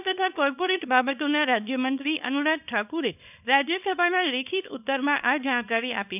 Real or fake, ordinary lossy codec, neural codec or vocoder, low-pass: fake; none; codec, 24 kHz, 0.9 kbps, WavTokenizer, small release; 3.6 kHz